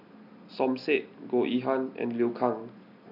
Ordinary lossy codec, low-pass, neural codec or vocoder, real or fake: none; 5.4 kHz; none; real